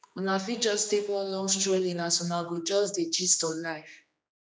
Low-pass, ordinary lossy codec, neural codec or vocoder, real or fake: none; none; codec, 16 kHz, 2 kbps, X-Codec, HuBERT features, trained on general audio; fake